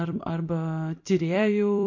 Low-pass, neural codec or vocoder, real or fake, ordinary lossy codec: 7.2 kHz; vocoder, 44.1 kHz, 128 mel bands every 256 samples, BigVGAN v2; fake; MP3, 48 kbps